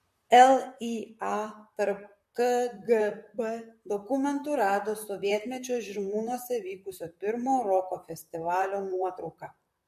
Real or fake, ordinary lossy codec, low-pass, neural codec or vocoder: fake; MP3, 64 kbps; 14.4 kHz; vocoder, 44.1 kHz, 128 mel bands, Pupu-Vocoder